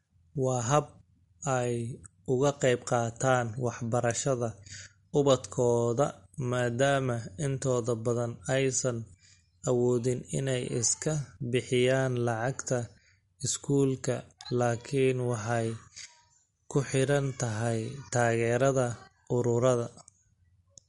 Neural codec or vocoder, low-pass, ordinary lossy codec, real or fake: none; 19.8 kHz; MP3, 48 kbps; real